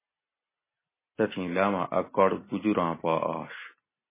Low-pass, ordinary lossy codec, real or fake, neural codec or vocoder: 3.6 kHz; MP3, 16 kbps; real; none